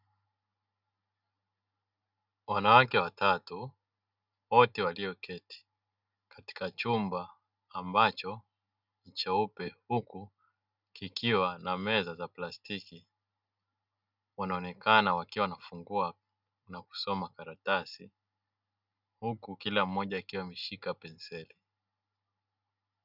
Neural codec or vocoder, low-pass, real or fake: none; 5.4 kHz; real